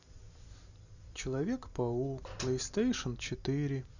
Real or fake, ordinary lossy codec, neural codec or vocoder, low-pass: real; none; none; 7.2 kHz